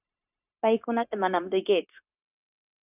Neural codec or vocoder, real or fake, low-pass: codec, 16 kHz, 0.9 kbps, LongCat-Audio-Codec; fake; 3.6 kHz